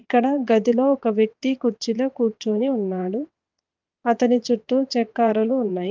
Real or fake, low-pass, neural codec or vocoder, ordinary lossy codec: fake; 7.2 kHz; vocoder, 22.05 kHz, 80 mel bands, WaveNeXt; Opus, 24 kbps